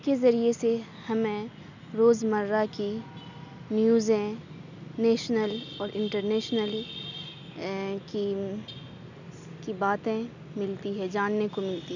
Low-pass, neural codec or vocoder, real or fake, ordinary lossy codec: 7.2 kHz; none; real; none